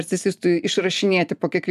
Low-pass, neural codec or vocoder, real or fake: 14.4 kHz; none; real